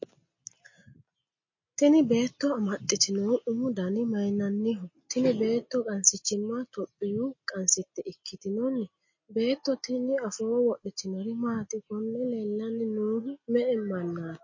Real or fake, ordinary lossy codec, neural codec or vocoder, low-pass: real; MP3, 32 kbps; none; 7.2 kHz